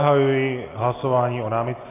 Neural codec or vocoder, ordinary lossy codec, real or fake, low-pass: none; AAC, 16 kbps; real; 3.6 kHz